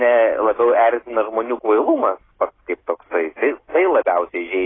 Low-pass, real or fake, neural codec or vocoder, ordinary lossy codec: 7.2 kHz; real; none; AAC, 16 kbps